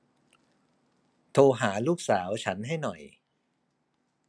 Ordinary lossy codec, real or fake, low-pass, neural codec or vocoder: none; real; none; none